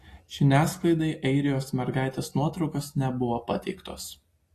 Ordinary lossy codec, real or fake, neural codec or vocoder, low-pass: AAC, 48 kbps; real; none; 14.4 kHz